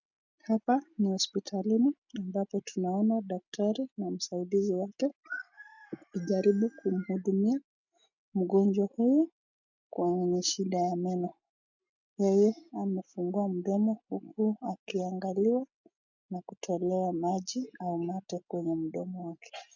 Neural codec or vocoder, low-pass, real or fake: none; 7.2 kHz; real